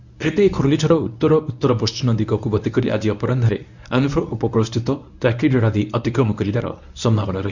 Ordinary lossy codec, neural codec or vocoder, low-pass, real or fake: none; codec, 24 kHz, 0.9 kbps, WavTokenizer, medium speech release version 1; 7.2 kHz; fake